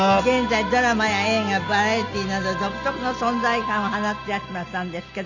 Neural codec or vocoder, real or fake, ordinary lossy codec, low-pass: none; real; none; 7.2 kHz